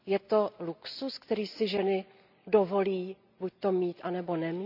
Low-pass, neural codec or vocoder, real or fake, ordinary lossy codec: 5.4 kHz; none; real; none